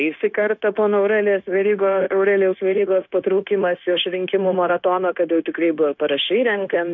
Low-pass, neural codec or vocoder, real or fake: 7.2 kHz; codec, 16 kHz, 0.9 kbps, LongCat-Audio-Codec; fake